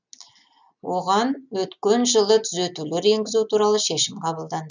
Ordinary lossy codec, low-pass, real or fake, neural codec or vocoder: none; 7.2 kHz; real; none